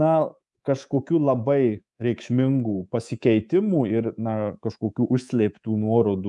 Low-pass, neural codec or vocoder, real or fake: 10.8 kHz; codec, 24 kHz, 3.1 kbps, DualCodec; fake